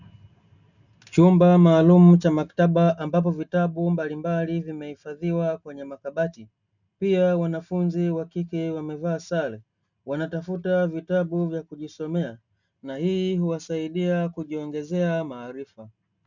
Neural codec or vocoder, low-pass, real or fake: none; 7.2 kHz; real